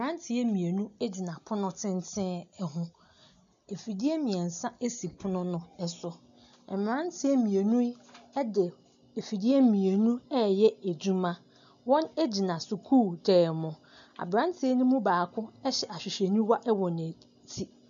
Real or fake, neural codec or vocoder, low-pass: real; none; 7.2 kHz